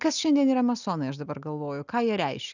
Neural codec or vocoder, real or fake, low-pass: none; real; 7.2 kHz